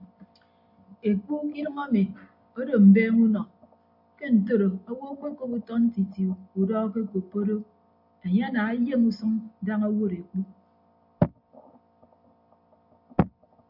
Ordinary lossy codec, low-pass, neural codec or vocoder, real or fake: MP3, 48 kbps; 5.4 kHz; none; real